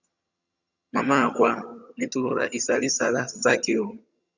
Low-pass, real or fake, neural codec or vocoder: 7.2 kHz; fake; vocoder, 22.05 kHz, 80 mel bands, HiFi-GAN